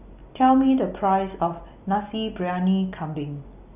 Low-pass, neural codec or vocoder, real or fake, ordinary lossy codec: 3.6 kHz; none; real; none